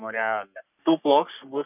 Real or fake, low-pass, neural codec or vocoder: fake; 3.6 kHz; codec, 44.1 kHz, 3.4 kbps, Pupu-Codec